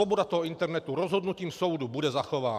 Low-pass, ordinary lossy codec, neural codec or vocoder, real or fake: 14.4 kHz; MP3, 96 kbps; none; real